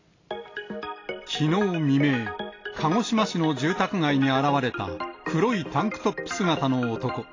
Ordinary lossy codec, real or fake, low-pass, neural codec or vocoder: AAC, 32 kbps; real; 7.2 kHz; none